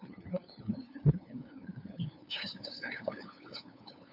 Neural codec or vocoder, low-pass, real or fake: codec, 16 kHz, 2 kbps, FunCodec, trained on LibriTTS, 25 frames a second; 5.4 kHz; fake